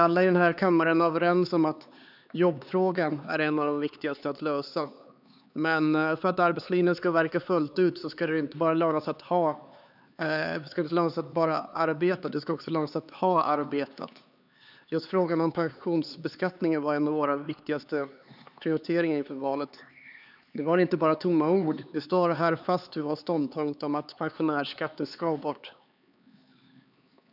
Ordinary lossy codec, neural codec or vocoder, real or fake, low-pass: none; codec, 16 kHz, 4 kbps, X-Codec, HuBERT features, trained on LibriSpeech; fake; 5.4 kHz